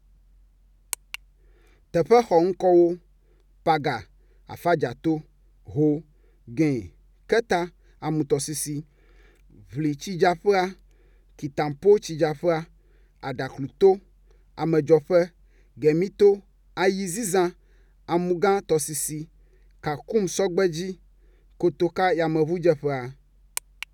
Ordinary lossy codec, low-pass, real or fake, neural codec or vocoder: none; 19.8 kHz; real; none